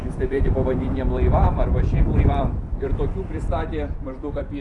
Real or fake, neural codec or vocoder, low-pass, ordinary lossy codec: fake; vocoder, 44.1 kHz, 128 mel bands every 256 samples, BigVGAN v2; 10.8 kHz; AAC, 48 kbps